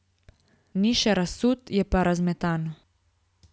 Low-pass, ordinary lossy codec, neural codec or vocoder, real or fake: none; none; none; real